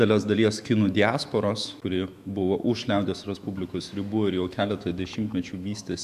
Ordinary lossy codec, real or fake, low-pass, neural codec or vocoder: MP3, 96 kbps; fake; 14.4 kHz; autoencoder, 48 kHz, 128 numbers a frame, DAC-VAE, trained on Japanese speech